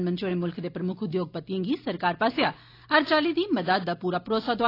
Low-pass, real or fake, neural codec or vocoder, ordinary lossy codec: 5.4 kHz; real; none; AAC, 32 kbps